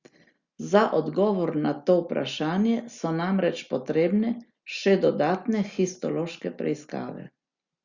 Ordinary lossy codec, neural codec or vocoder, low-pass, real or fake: Opus, 64 kbps; none; 7.2 kHz; real